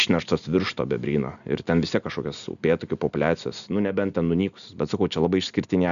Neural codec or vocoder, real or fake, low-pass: none; real; 7.2 kHz